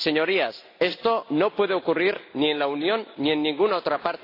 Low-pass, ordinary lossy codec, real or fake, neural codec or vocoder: 5.4 kHz; AAC, 32 kbps; real; none